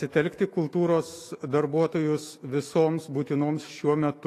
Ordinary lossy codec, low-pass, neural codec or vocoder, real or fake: AAC, 48 kbps; 14.4 kHz; autoencoder, 48 kHz, 128 numbers a frame, DAC-VAE, trained on Japanese speech; fake